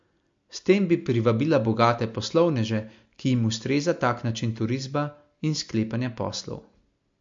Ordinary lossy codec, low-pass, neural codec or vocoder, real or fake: MP3, 48 kbps; 7.2 kHz; none; real